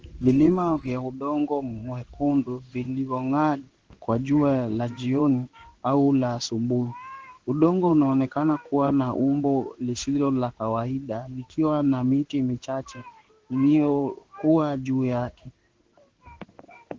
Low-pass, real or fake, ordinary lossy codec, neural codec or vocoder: 7.2 kHz; fake; Opus, 16 kbps; codec, 16 kHz in and 24 kHz out, 1 kbps, XY-Tokenizer